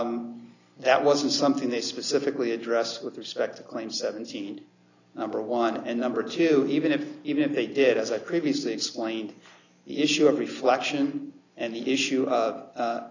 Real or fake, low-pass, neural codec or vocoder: real; 7.2 kHz; none